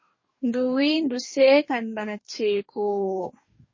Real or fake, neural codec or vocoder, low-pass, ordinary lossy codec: fake; codec, 44.1 kHz, 2.6 kbps, DAC; 7.2 kHz; MP3, 32 kbps